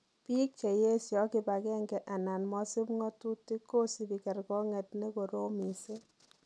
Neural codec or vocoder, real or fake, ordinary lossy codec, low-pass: none; real; none; none